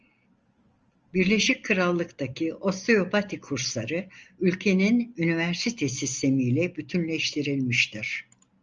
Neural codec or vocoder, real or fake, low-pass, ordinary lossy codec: none; real; 7.2 kHz; Opus, 24 kbps